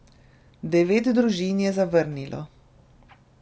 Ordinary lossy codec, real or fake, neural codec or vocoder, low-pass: none; real; none; none